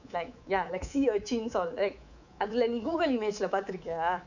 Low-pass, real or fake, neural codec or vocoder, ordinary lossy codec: 7.2 kHz; fake; codec, 24 kHz, 3.1 kbps, DualCodec; none